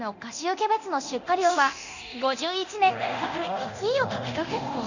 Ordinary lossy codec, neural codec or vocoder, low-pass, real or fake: none; codec, 24 kHz, 0.9 kbps, DualCodec; 7.2 kHz; fake